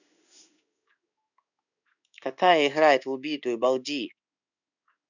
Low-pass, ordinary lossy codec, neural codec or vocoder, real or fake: 7.2 kHz; none; codec, 16 kHz in and 24 kHz out, 1 kbps, XY-Tokenizer; fake